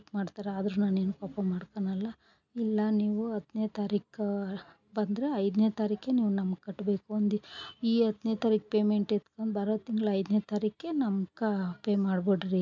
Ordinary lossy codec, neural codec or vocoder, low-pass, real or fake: none; none; 7.2 kHz; real